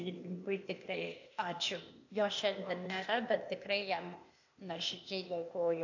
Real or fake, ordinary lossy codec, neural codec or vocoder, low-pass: fake; AAC, 48 kbps; codec, 16 kHz, 0.8 kbps, ZipCodec; 7.2 kHz